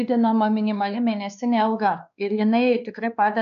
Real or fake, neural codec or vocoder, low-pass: fake; codec, 16 kHz, 2 kbps, X-Codec, WavLM features, trained on Multilingual LibriSpeech; 7.2 kHz